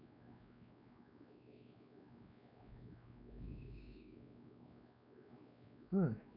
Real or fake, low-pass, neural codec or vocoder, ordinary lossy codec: fake; 5.4 kHz; codec, 16 kHz, 2 kbps, X-Codec, WavLM features, trained on Multilingual LibriSpeech; none